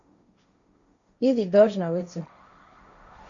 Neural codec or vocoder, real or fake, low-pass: codec, 16 kHz, 1.1 kbps, Voila-Tokenizer; fake; 7.2 kHz